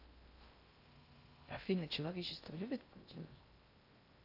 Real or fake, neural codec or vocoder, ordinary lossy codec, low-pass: fake; codec, 16 kHz in and 24 kHz out, 0.6 kbps, FocalCodec, streaming, 2048 codes; AAC, 32 kbps; 5.4 kHz